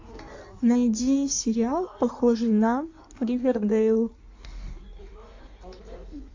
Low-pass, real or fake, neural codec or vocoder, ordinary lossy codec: 7.2 kHz; fake; codec, 16 kHz in and 24 kHz out, 1.1 kbps, FireRedTTS-2 codec; AAC, 48 kbps